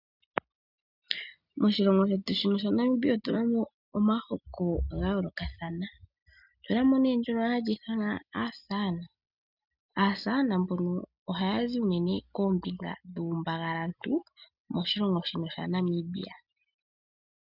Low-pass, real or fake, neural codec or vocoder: 5.4 kHz; real; none